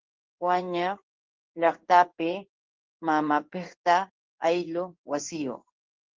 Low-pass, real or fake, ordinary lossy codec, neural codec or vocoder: 7.2 kHz; fake; Opus, 16 kbps; codec, 16 kHz in and 24 kHz out, 1 kbps, XY-Tokenizer